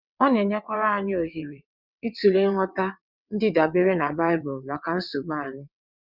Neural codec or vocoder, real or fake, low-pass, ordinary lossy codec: vocoder, 22.05 kHz, 80 mel bands, WaveNeXt; fake; 5.4 kHz; Opus, 64 kbps